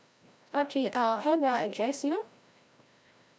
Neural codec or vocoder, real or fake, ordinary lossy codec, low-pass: codec, 16 kHz, 0.5 kbps, FreqCodec, larger model; fake; none; none